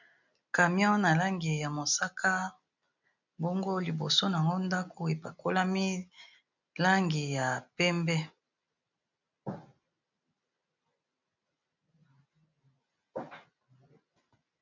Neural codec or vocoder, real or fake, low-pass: none; real; 7.2 kHz